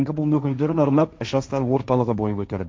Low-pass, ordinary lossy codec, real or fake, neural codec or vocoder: none; none; fake; codec, 16 kHz, 1.1 kbps, Voila-Tokenizer